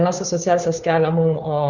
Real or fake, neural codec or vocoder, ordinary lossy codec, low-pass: fake; codec, 16 kHz, 2 kbps, FunCodec, trained on Chinese and English, 25 frames a second; Opus, 64 kbps; 7.2 kHz